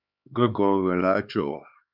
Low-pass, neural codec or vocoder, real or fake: 5.4 kHz; codec, 16 kHz, 4 kbps, X-Codec, HuBERT features, trained on LibriSpeech; fake